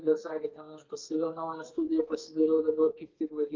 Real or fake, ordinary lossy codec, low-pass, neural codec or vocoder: fake; Opus, 32 kbps; 7.2 kHz; codec, 32 kHz, 1.9 kbps, SNAC